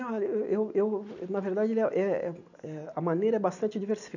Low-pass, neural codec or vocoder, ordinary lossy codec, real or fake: 7.2 kHz; none; none; real